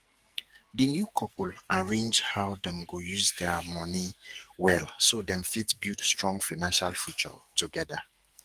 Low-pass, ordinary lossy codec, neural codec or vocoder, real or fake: 14.4 kHz; Opus, 32 kbps; codec, 44.1 kHz, 2.6 kbps, SNAC; fake